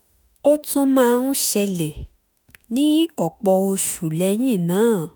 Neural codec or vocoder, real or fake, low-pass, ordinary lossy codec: autoencoder, 48 kHz, 32 numbers a frame, DAC-VAE, trained on Japanese speech; fake; none; none